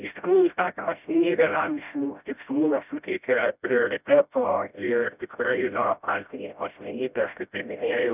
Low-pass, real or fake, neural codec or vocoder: 3.6 kHz; fake; codec, 16 kHz, 0.5 kbps, FreqCodec, smaller model